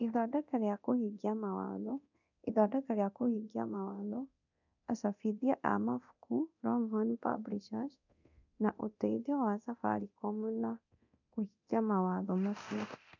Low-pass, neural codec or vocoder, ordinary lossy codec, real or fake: 7.2 kHz; codec, 24 kHz, 0.9 kbps, DualCodec; none; fake